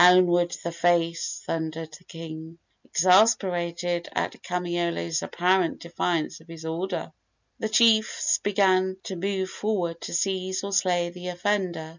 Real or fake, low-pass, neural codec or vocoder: real; 7.2 kHz; none